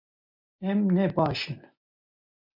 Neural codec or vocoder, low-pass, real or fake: none; 5.4 kHz; real